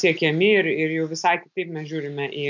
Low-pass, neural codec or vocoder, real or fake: 7.2 kHz; none; real